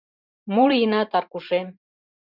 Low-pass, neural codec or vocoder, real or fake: 5.4 kHz; vocoder, 44.1 kHz, 128 mel bands every 512 samples, BigVGAN v2; fake